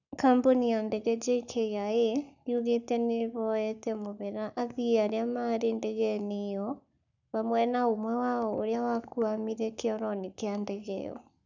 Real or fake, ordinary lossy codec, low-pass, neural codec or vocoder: fake; none; 7.2 kHz; codec, 44.1 kHz, 7.8 kbps, Pupu-Codec